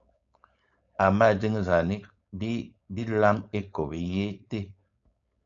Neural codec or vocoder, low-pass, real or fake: codec, 16 kHz, 4.8 kbps, FACodec; 7.2 kHz; fake